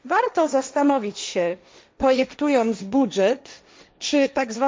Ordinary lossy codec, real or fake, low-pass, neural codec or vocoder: none; fake; none; codec, 16 kHz, 1.1 kbps, Voila-Tokenizer